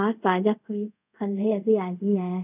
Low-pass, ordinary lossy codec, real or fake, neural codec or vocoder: 3.6 kHz; none; fake; codec, 24 kHz, 0.5 kbps, DualCodec